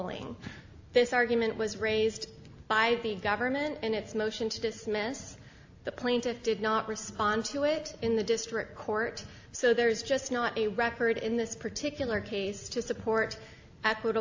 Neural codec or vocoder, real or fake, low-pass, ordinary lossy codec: none; real; 7.2 kHz; AAC, 48 kbps